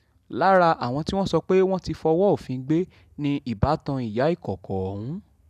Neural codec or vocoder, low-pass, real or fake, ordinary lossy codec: none; 14.4 kHz; real; none